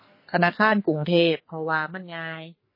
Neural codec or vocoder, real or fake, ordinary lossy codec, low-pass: codec, 44.1 kHz, 2.6 kbps, SNAC; fake; MP3, 24 kbps; 5.4 kHz